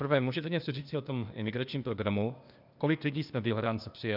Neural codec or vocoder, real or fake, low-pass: codec, 16 kHz, 0.8 kbps, ZipCodec; fake; 5.4 kHz